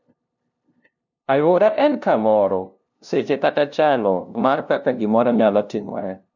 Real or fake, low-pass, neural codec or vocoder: fake; 7.2 kHz; codec, 16 kHz, 0.5 kbps, FunCodec, trained on LibriTTS, 25 frames a second